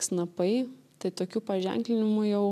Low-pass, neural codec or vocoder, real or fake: 14.4 kHz; none; real